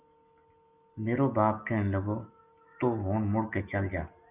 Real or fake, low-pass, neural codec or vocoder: real; 3.6 kHz; none